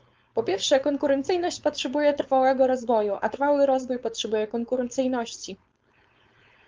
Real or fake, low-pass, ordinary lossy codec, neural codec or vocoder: fake; 7.2 kHz; Opus, 24 kbps; codec, 16 kHz, 4.8 kbps, FACodec